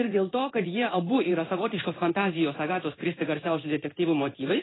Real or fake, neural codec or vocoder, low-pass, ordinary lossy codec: fake; codec, 16 kHz in and 24 kHz out, 1 kbps, XY-Tokenizer; 7.2 kHz; AAC, 16 kbps